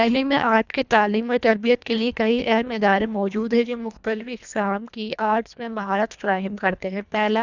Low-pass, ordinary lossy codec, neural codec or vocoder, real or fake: 7.2 kHz; none; codec, 24 kHz, 1.5 kbps, HILCodec; fake